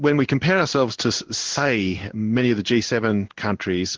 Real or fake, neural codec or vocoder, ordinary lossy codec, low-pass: fake; codec, 16 kHz in and 24 kHz out, 1 kbps, XY-Tokenizer; Opus, 16 kbps; 7.2 kHz